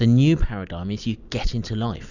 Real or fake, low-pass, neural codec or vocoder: real; 7.2 kHz; none